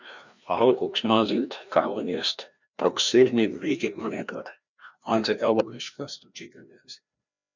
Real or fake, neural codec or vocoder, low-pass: fake; codec, 16 kHz, 1 kbps, FreqCodec, larger model; 7.2 kHz